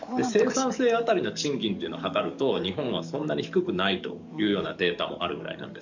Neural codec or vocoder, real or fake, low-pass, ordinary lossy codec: codec, 44.1 kHz, 7.8 kbps, DAC; fake; 7.2 kHz; none